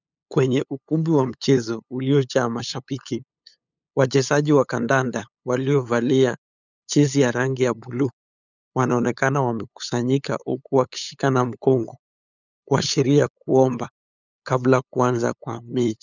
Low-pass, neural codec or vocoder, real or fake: 7.2 kHz; codec, 16 kHz, 8 kbps, FunCodec, trained on LibriTTS, 25 frames a second; fake